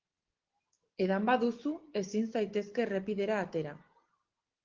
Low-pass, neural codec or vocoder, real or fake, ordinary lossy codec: 7.2 kHz; none; real; Opus, 16 kbps